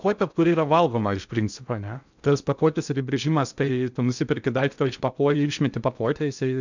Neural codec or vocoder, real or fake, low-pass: codec, 16 kHz in and 24 kHz out, 0.6 kbps, FocalCodec, streaming, 2048 codes; fake; 7.2 kHz